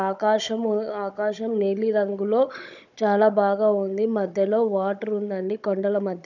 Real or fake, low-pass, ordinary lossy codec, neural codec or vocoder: fake; 7.2 kHz; none; codec, 16 kHz, 16 kbps, FunCodec, trained on Chinese and English, 50 frames a second